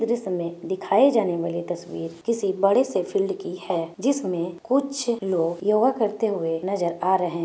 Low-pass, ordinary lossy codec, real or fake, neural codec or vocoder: none; none; real; none